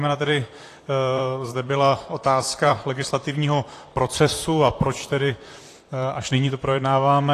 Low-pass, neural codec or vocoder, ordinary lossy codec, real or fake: 14.4 kHz; vocoder, 44.1 kHz, 128 mel bands, Pupu-Vocoder; AAC, 48 kbps; fake